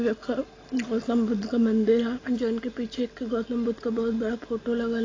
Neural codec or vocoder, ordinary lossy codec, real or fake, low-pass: none; AAC, 32 kbps; real; 7.2 kHz